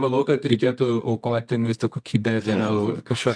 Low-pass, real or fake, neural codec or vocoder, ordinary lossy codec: 9.9 kHz; fake; codec, 24 kHz, 0.9 kbps, WavTokenizer, medium music audio release; MP3, 64 kbps